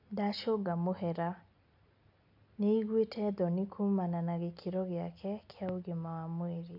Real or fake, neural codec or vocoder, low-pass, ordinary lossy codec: real; none; 5.4 kHz; none